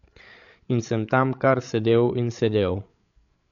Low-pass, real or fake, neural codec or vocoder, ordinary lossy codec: 7.2 kHz; fake; codec, 16 kHz, 8 kbps, FreqCodec, larger model; none